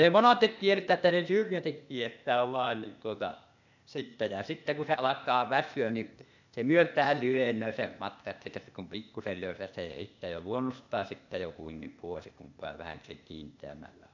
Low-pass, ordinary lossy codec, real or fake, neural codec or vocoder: 7.2 kHz; none; fake; codec, 16 kHz, 0.8 kbps, ZipCodec